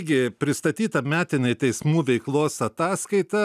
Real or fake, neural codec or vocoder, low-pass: real; none; 14.4 kHz